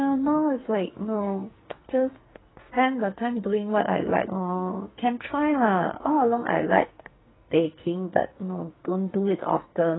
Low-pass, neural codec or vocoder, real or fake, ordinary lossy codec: 7.2 kHz; codec, 44.1 kHz, 2.6 kbps, SNAC; fake; AAC, 16 kbps